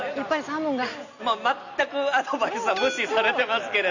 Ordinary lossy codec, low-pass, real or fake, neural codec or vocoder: none; 7.2 kHz; real; none